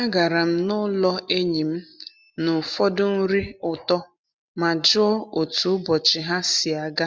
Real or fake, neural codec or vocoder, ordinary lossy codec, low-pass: real; none; none; none